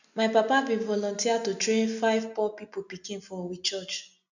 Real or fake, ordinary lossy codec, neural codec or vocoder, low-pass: real; none; none; 7.2 kHz